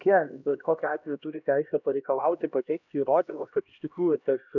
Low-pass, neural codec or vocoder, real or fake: 7.2 kHz; codec, 16 kHz, 1 kbps, X-Codec, HuBERT features, trained on LibriSpeech; fake